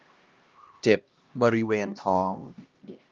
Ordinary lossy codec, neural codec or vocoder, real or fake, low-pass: Opus, 32 kbps; codec, 16 kHz, 1 kbps, X-Codec, HuBERT features, trained on LibriSpeech; fake; 7.2 kHz